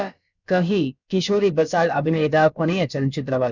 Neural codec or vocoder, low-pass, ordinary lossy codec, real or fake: codec, 16 kHz, about 1 kbps, DyCAST, with the encoder's durations; 7.2 kHz; none; fake